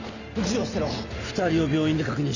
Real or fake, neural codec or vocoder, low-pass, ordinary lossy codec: real; none; 7.2 kHz; none